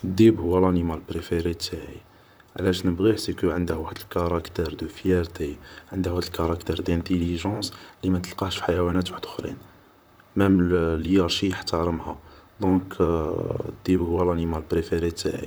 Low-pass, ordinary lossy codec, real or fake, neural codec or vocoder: none; none; fake; vocoder, 44.1 kHz, 128 mel bands, Pupu-Vocoder